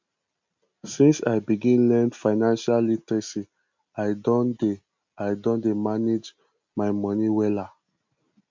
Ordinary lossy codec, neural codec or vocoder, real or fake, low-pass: MP3, 64 kbps; none; real; 7.2 kHz